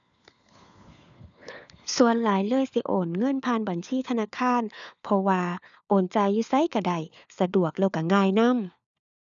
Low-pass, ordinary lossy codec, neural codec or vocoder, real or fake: 7.2 kHz; none; codec, 16 kHz, 4 kbps, FunCodec, trained on LibriTTS, 50 frames a second; fake